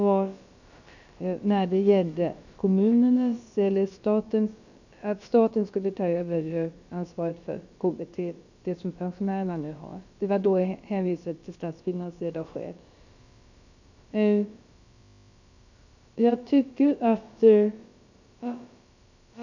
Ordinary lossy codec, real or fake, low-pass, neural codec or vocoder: none; fake; 7.2 kHz; codec, 16 kHz, about 1 kbps, DyCAST, with the encoder's durations